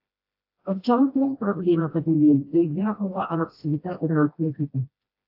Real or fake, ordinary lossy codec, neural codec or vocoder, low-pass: fake; AAC, 48 kbps; codec, 16 kHz, 1 kbps, FreqCodec, smaller model; 5.4 kHz